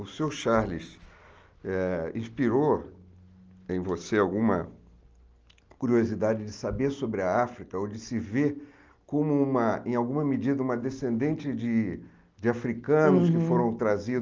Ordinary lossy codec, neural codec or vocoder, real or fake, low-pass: Opus, 24 kbps; none; real; 7.2 kHz